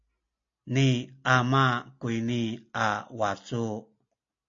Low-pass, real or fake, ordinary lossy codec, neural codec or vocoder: 7.2 kHz; real; AAC, 48 kbps; none